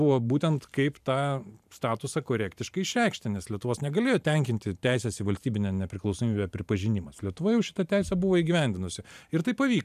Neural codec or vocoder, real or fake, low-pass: vocoder, 44.1 kHz, 128 mel bands every 512 samples, BigVGAN v2; fake; 14.4 kHz